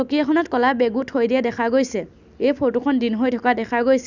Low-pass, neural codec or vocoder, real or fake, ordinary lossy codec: 7.2 kHz; none; real; none